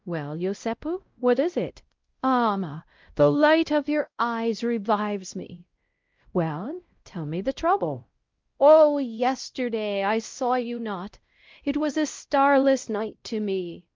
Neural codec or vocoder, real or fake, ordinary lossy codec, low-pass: codec, 16 kHz, 0.5 kbps, X-Codec, WavLM features, trained on Multilingual LibriSpeech; fake; Opus, 32 kbps; 7.2 kHz